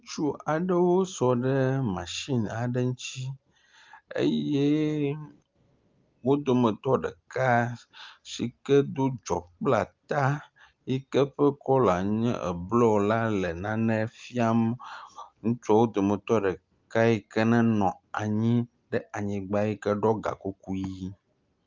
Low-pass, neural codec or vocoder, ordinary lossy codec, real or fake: 7.2 kHz; none; Opus, 32 kbps; real